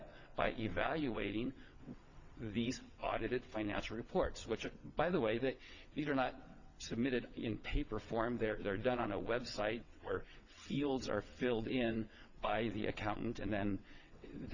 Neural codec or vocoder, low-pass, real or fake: vocoder, 22.05 kHz, 80 mel bands, WaveNeXt; 7.2 kHz; fake